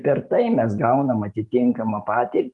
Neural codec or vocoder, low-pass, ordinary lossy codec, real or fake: none; 10.8 kHz; Opus, 32 kbps; real